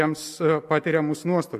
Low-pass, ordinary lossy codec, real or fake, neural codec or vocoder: 14.4 kHz; MP3, 64 kbps; real; none